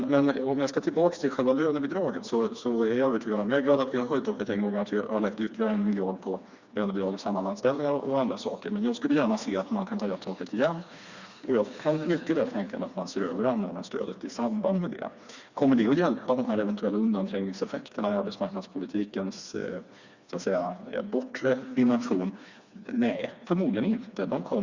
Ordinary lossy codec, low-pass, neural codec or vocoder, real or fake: Opus, 64 kbps; 7.2 kHz; codec, 16 kHz, 2 kbps, FreqCodec, smaller model; fake